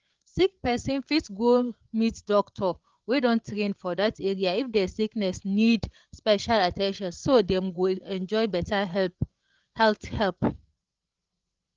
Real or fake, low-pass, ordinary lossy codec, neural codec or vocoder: fake; 7.2 kHz; Opus, 24 kbps; codec, 16 kHz, 8 kbps, FreqCodec, larger model